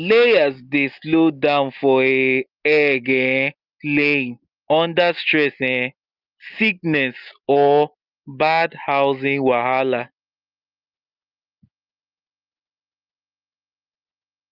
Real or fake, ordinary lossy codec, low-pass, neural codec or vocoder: real; Opus, 24 kbps; 5.4 kHz; none